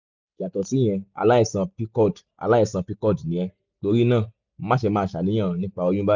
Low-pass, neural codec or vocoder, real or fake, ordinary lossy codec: 7.2 kHz; none; real; none